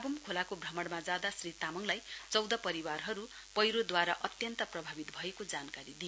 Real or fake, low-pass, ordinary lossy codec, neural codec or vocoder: real; none; none; none